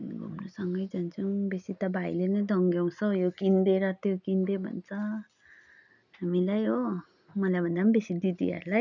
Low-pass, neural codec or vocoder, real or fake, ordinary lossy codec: 7.2 kHz; none; real; none